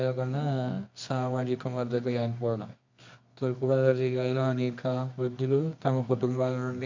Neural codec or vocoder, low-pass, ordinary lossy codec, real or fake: codec, 24 kHz, 0.9 kbps, WavTokenizer, medium music audio release; 7.2 kHz; MP3, 48 kbps; fake